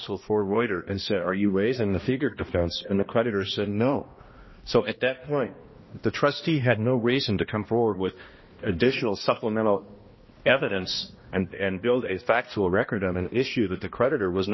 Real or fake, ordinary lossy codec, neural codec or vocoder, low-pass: fake; MP3, 24 kbps; codec, 16 kHz, 1 kbps, X-Codec, HuBERT features, trained on balanced general audio; 7.2 kHz